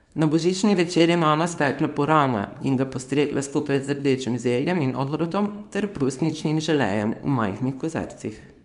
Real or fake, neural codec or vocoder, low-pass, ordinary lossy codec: fake; codec, 24 kHz, 0.9 kbps, WavTokenizer, small release; 10.8 kHz; none